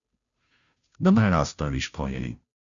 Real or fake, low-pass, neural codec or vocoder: fake; 7.2 kHz; codec, 16 kHz, 0.5 kbps, FunCodec, trained on Chinese and English, 25 frames a second